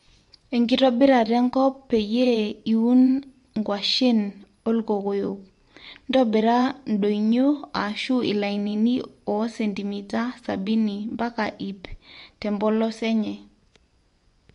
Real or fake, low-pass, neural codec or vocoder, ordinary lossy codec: fake; 10.8 kHz; vocoder, 24 kHz, 100 mel bands, Vocos; MP3, 64 kbps